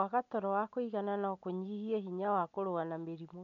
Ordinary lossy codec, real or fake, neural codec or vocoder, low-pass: none; real; none; 7.2 kHz